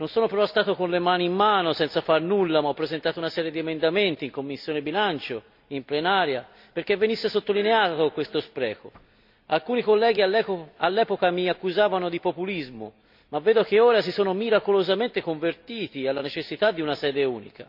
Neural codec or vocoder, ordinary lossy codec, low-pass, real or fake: none; MP3, 48 kbps; 5.4 kHz; real